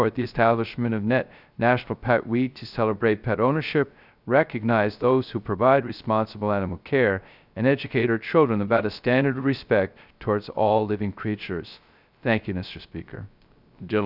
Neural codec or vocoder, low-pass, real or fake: codec, 16 kHz, 0.3 kbps, FocalCodec; 5.4 kHz; fake